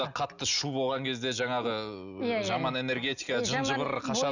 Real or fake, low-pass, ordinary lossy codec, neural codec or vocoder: real; 7.2 kHz; none; none